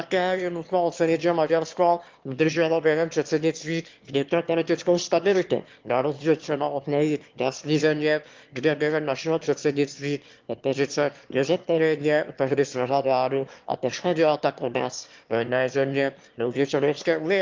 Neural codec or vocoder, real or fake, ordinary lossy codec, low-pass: autoencoder, 22.05 kHz, a latent of 192 numbers a frame, VITS, trained on one speaker; fake; Opus, 32 kbps; 7.2 kHz